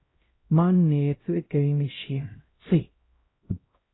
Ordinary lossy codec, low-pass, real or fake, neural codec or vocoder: AAC, 16 kbps; 7.2 kHz; fake; codec, 16 kHz, 0.5 kbps, X-Codec, HuBERT features, trained on LibriSpeech